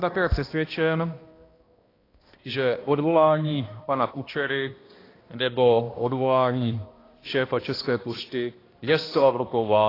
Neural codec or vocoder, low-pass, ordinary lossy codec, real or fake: codec, 16 kHz, 1 kbps, X-Codec, HuBERT features, trained on balanced general audio; 5.4 kHz; AAC, 24 kbps; fake